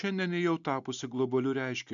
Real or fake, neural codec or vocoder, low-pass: real; none; 7.2 kHz